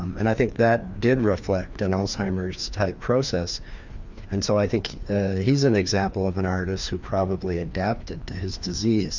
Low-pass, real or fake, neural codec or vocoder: 7.2 kHz; fake; codec, 16 kHz, 2 kbps, FreqCodec, larger model